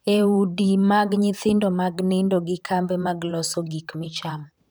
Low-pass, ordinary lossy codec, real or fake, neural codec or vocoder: none; none; fake; vocoder, 44.1 kHz, 128 mel bands, Pupu-Vocoder